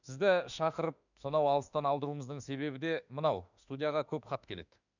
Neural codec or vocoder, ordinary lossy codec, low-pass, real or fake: autoencoder, 48 kHz, 32 numbers a frame, DAC-VAE, trained on Japanese speech; none; 7.2 kHz; fake